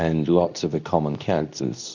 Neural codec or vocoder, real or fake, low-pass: codec, 24 kHz, 0.9 kbps, WavTokenizer, medium speech release version 2; fake; 7.2 kHz